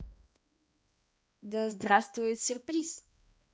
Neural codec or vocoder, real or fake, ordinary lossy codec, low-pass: codec, 16 kHz, 1 kbps, X-Codec, HuBERT features, trained on balanced general audio; fake; none; none